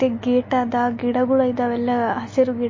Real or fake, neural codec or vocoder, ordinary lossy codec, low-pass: real; none; MP3, 32 kbps; 7.2 kHz